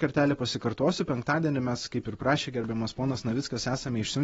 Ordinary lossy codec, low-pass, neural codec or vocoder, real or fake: AAC, 24 kbps; 19.8 kHz; none; real